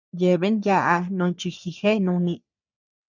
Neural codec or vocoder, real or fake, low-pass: codec, 44.1 kHz, 3.4 kbps, Pupu-Codec; fake; 7.2 kHz